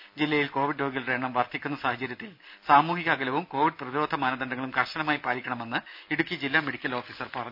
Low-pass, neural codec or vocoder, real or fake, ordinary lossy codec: 5.4 kHz; none; real; none